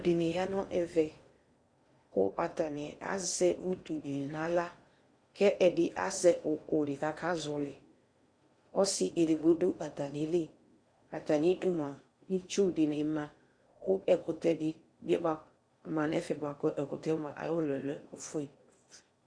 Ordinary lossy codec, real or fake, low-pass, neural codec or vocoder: Opus, 64 kbps; fake; 9.9 kHz; codec, 16 kHz in and 24 kHz out, 0.8 kbps, FocalCodec, streaming, 65536 codes